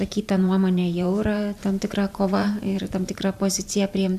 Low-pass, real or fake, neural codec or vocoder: 14.4 kHz; fake; vocoder, 44.1 kHz, 128 mel bands every 512 samples, BigVGAN v2